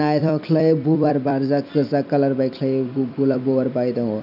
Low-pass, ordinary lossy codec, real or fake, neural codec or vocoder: 5.4 kHz; none; fake; vocoder, 44.1 kHz, 128 mel bands every 256 samples, BigVGAN v2